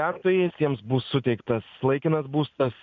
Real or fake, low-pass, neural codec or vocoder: real; 7.2 kHz; none